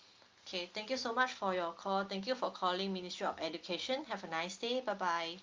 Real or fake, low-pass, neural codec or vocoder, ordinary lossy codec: real; 7.2 kHz; none; Opus, 32 kbps